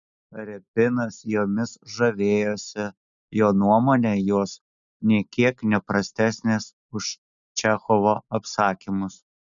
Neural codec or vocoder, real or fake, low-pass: none; real; 7.2 kHz